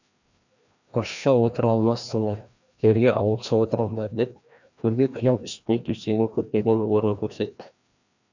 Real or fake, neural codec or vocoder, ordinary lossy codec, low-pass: fake; codec, 16 kHz, 1 kbps, FreqCodec, larger model; none; 7.2 kHz